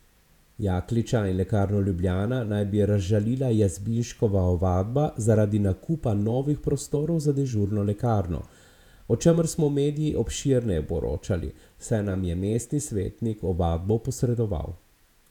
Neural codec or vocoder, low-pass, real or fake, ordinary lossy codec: vocoder, 48 kHz, 128 mel bands, Vocos; 19.8 kHz; fake; none